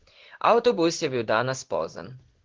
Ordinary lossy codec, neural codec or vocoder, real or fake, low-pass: Opus, 16 kbps; codec, 16 kHz in and 24 kHz out, 1 kbps, XY-Tokenizer; fake; 7.2 kHz